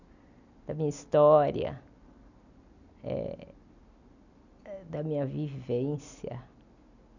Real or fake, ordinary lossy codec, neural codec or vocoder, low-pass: real; none; none; 7.2 kHz